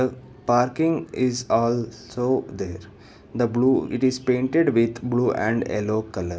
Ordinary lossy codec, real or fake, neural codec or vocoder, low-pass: none; real; none; none